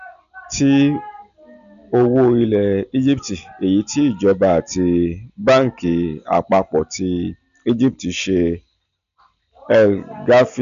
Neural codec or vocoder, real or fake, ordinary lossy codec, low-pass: none; real; none; 7.2 kHz